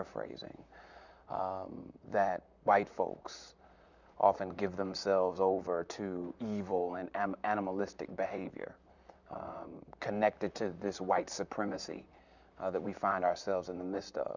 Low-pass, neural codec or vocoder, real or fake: 7.2 kHz; vocoder, 44.1 kHz, 128 mel bands, Pupu-Vocoder; fake